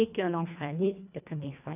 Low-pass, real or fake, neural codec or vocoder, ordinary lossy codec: 3.6 kHz; fake; codec, 24 kHz, 1.5 kbps, HILCodec; none